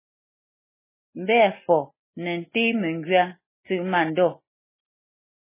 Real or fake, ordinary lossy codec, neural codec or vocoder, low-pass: real; MP3, 16 kbps; none; 3.6 kHz